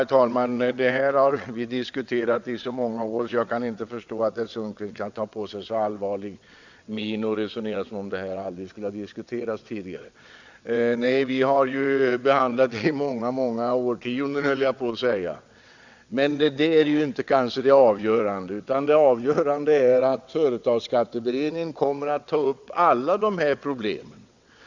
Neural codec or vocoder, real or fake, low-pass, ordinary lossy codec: vocoder, 22.05 kHz, 80 mel bands, WaveNeXt; fake; 7.2 kHz; Opus, 64 kbps